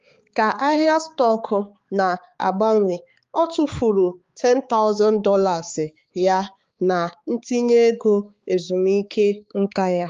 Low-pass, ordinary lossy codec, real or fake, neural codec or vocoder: 7.2 kHz; Opus, 32 kbps; fake; codec, 16 kHz, 4 kbps, X-Codec, HuBERT features, trained on balanced general audio